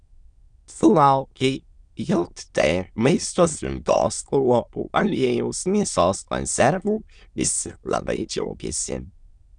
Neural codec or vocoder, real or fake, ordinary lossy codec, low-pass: autoencoder, 22.05 kHz, a latent of 192 numbers a frame, VITS, trained on many speakers; fake; Opus, 64 kbps; 9.9 kHz